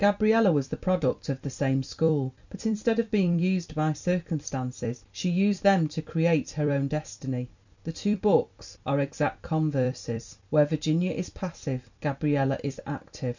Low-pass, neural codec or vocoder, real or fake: 7.2 kHz; vocoder, 44.1 kHz, 128 mel bands every 256 samples, BigVGAN v2; fake